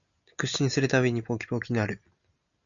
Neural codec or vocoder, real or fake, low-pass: none; real; 7.2 kHz